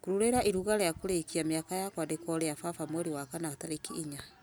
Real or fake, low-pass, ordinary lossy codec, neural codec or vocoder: real; none; none; none